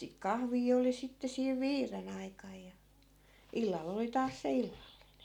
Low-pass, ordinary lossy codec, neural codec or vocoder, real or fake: 19.8 kHz; none; none; real